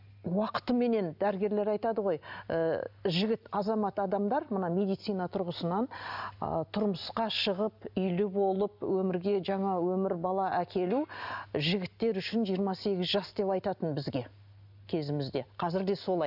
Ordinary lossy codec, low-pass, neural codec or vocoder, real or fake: none; 5.4 kHz; none; real